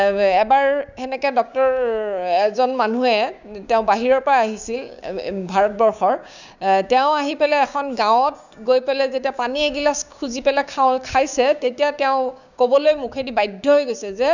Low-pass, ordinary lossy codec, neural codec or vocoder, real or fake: 7.2 kHz; none; codec, 16 kHz, 6 kbps, DAC; fake